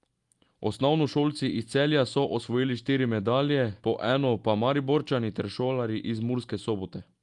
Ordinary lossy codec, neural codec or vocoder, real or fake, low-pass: Opus, 32 kbps; none; real; 10.8 kHz